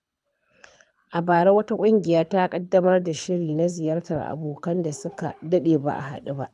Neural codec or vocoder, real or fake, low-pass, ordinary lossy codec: codec, 24 kHz, 6 kbps, HILCodec; fake; none; none